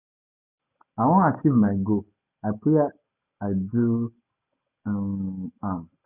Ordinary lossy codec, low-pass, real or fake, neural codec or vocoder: none; 3.6 kHz; real; none